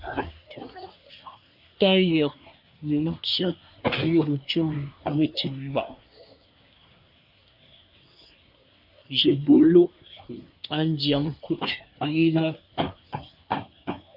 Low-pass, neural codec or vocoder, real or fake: 5.4 kHz; codec, 24 kHz, 1 kbps, SNAC; fake